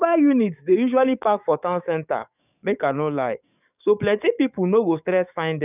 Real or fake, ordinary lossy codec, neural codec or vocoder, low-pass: fake; none; codec, 44.1 kHz, 7.8 kbps, DAC; 3.6 kHz